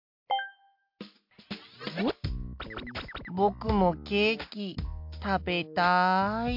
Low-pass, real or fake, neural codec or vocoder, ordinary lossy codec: 5.4 kHz; real; none; MP3, 48 kbps